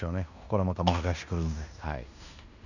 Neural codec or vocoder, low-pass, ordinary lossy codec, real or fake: codec, 16 kHz in and 24 kHz out, 0.9 kbps, LongCat-Audio-Codec, fine tuned four codebook decoder; 7.2 kHz; none; fake